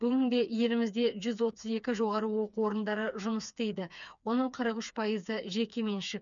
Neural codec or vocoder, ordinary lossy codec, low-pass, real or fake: codec, 16 kHz, 4 kbps, FreqCodec, smaller model; none; 7.2 kHz; fake